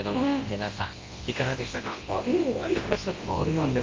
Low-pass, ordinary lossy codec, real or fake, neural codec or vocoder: 7.2 kHz; Opus, 32 kbps; fake; codec, 24 kHz, 0.9 kbps, WavTokenizer, large speech release